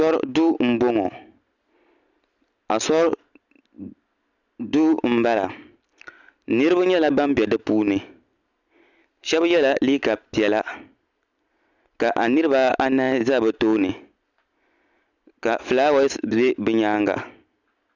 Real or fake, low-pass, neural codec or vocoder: real; 7.2 kHz; none